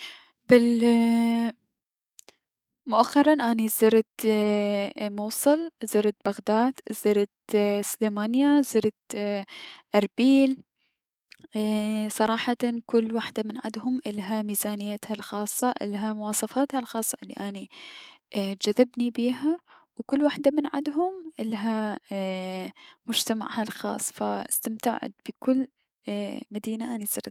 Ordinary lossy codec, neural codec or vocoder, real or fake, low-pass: none; codec, 44.1 kHz, 7.8 kbps, DAC; fake; 19.8 kHz